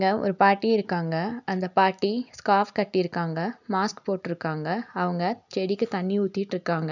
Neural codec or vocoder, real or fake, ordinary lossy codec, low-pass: none; real; none; 7.2 kHz